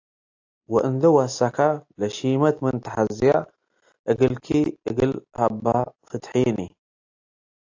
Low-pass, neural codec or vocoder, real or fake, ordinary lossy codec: 7.2 kHz; none; real; AAC, 48 kbps